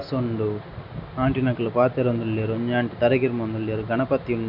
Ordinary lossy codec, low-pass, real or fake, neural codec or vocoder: none; 5.4 kHz; real; none